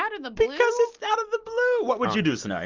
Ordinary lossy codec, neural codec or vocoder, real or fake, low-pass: Opus, 32 kbps; none; real; 7.2 kHz